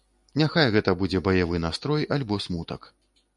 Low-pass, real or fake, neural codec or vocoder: 10.8 kHz; real; none